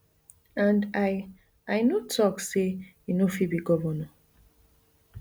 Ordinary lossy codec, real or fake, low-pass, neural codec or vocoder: none; real; none; none